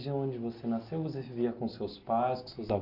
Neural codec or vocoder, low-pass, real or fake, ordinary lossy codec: none; 5.4 kHz; real; MP3, 48 kbps